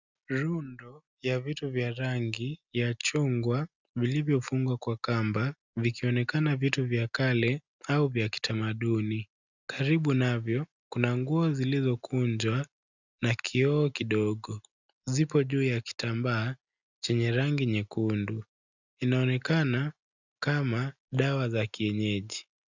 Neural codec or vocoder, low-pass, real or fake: none; 7.2 kHz; real